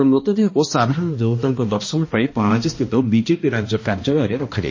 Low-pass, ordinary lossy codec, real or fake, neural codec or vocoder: 7.2 kHz; MP3, 32 kbps; fake; codec, 16 kHz, 1 kbps, X-Codec, HuBERT features, trained on balanced general audio